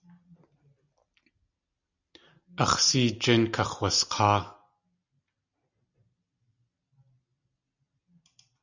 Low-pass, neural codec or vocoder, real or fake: 7.2 kHz; none; real